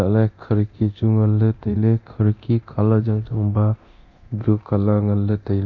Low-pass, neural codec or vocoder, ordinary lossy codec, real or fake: 7.2 kHz; codec, 24 kHz, 0.9 kbps, DualCodec; Opus, 64 kbps; fake